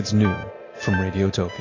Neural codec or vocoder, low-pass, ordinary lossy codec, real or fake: none; 7.2 kHz; AAC, 32 kbps; real